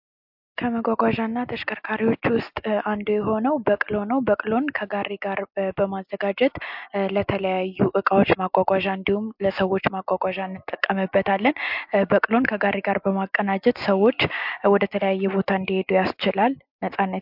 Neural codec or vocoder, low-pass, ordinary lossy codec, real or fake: none; 5.4 kHz; MP3, 48 kbps; real